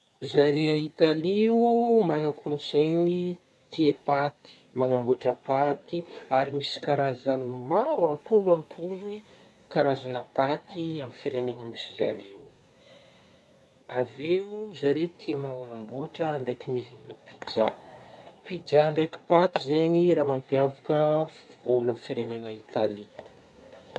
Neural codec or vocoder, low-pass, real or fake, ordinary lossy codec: codec, 24 kHz, 1 kbps, SNAC; none; fake; none